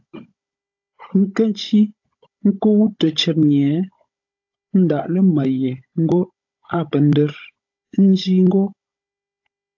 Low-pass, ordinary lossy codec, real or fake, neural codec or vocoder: 7.2 kHz; AAC, 48 kbps; fake; codec, 16 kHz, 16 kbps, FunCodec, trained on Chinese and English, 50 frames a second